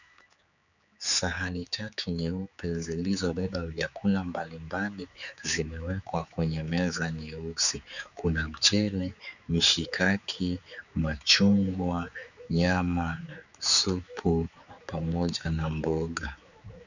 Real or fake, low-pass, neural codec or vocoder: fake; 7.2 kHz; codec, 16 kHz, 4 kbps, X-Codec, HuBERT features, trained on general audio